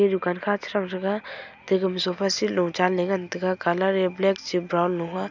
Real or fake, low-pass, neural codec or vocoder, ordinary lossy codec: real; 7.2 kHz; none; none